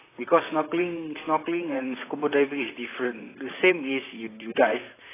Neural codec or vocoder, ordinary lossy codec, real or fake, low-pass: vocoder, 44.1 kHz, 128 mel bands, Pupu-Vocoder; AAC, 16 kbps; fake; 3.6 kHz